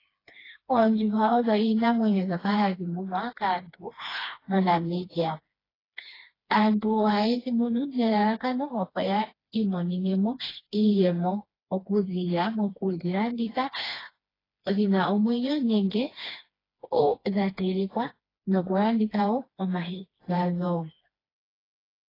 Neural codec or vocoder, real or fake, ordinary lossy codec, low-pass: codec, 16 kHz, 2 kbps, FreqCodec, smaller model; fake; AAC, 24 kbps; 5.4 kHz